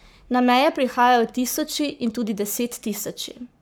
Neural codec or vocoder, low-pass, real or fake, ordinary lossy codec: codec, 44.1 kHz, 7.8 kbps, Pupu-Codec; none; fake; none